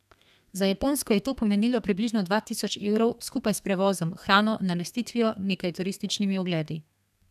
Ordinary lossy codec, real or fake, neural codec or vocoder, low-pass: none; fake; codec, 32 kHz, 1.9 kbps, SNAC; 14.4 kHz